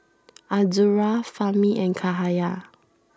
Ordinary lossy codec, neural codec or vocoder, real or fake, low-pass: none; none; real; none